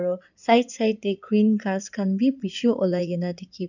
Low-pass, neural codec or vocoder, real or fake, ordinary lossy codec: 7.2 kHz; codec, 16 kHz in and 24 kHz out, 2.2 kbps, FireRedTTS-2 codec; fake; none